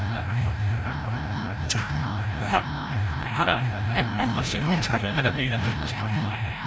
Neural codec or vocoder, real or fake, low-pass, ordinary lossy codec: codec, 16 kHz, 0.5 kbps, FreqCodec, larger model; fake; none; none